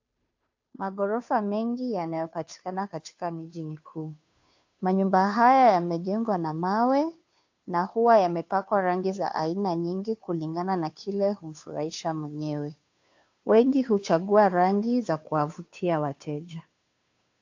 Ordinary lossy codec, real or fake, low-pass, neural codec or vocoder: AAC, 48 kbps; fake; 7.2 kHz; codec, 16 kHz, 2 kbps, FunCodec, trained on Chinese and English, 25 frames a second